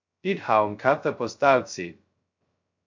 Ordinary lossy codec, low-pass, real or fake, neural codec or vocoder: MP3, 48 kbps; 7.2 kHz; fake; codec, 16 kHz, 0.2 kbps, FocalCodec